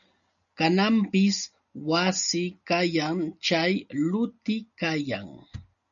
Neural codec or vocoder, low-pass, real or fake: none; 7.2 kHz; real